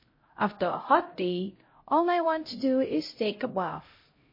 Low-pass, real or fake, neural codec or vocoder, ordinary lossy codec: 5.4 kHz; fake; codec, 16 kHz, 0.5 kbps, X-Codec, HuBERT features, trained on LibriSpeech; MP3, 24 kbps